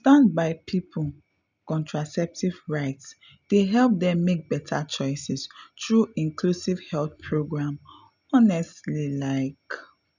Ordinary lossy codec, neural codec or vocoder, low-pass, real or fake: none; none; 7.2 kHz; real